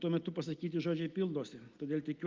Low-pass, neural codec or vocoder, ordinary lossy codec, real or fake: 7.2 kHz; none; Opus, 32 kbps; real